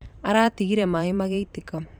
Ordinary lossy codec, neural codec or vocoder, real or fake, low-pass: none; vocoder, 44.1 kHz, 128 mel bands every 512 samples, BigVGAN v2; fake; 19.8 kHz